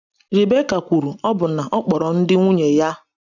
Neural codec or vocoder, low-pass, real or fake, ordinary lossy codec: none; 7.2 kHz; real; none